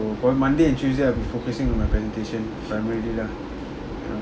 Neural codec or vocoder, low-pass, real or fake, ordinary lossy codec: none; none; real; none